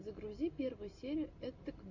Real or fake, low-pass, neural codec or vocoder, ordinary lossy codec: real; 7.2 kHz; none; MP3, 48 kbps